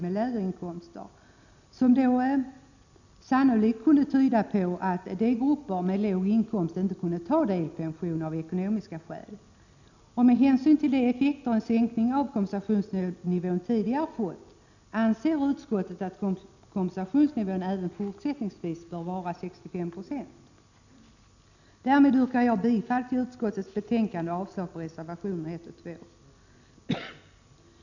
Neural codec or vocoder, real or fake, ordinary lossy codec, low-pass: none; real; none; 7.2 kHz